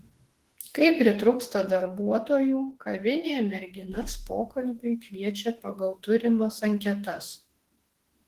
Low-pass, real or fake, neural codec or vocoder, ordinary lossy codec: 14.4 kHz; fake; autoencoder, 48 kHz, 32 numbers a frame, DAC-VAE, trained on Japanese speech; Opus, 16 kbps